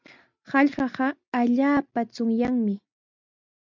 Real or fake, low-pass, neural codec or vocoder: real; 7.2 kHz; none